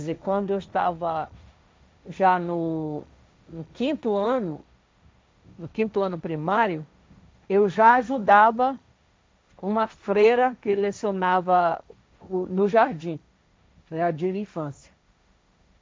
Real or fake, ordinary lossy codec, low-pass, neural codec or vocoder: fake; none; none; codec, 16 kHz, 1.1 kbps, Voila-Tokenizer